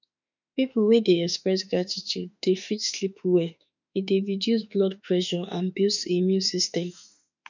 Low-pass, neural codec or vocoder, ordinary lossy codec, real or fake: 7.2 kHz; autoencoder, 48 kHz, 32 numbers a frame, DAC-VAE, trained on Japanese speech; none; fake